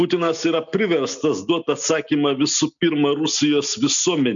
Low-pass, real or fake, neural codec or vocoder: 7.2 kHz; real; none